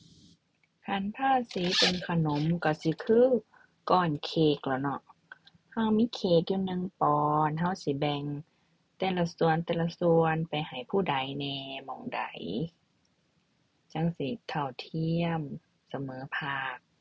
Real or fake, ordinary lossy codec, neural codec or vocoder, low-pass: real; none; none; none